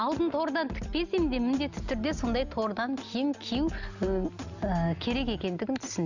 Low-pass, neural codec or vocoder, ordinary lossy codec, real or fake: 7.2 kHz; none; none; real